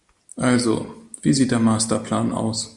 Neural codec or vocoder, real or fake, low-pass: none; real; 10.8 kHz